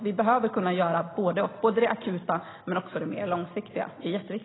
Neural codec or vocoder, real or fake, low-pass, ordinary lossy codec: none; real; 7.2 kHz; AAC, 16 kbps